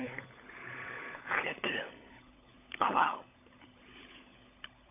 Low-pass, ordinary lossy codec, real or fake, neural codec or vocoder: 3.6 kHz; AAC, 24 kbps; fake; codec, 16 kHz, 16 kbps, FunCodec, trained on LibriTTS, 50 frames a second